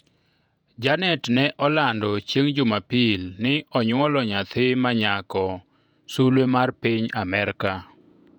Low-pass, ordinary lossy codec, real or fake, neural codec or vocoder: 19.8 kHz; none; fake; vocoder, 48 kHz, 128 mel bands, Vocos